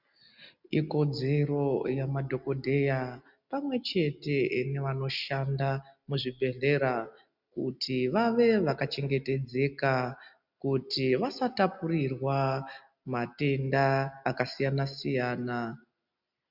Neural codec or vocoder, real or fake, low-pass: none; real; 5.4 kHz